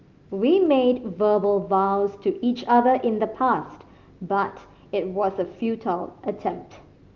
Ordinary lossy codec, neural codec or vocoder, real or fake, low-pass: Opus, 32 kbps; none; real; 7.2 kHz